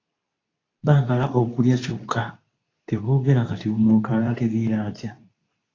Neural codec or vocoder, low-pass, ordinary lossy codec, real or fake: codec, 24 kHz, 0.9 kbps, WavTokenizer, medium speech release version 2; 7.2 kHz; AAC, 32 kbps; fake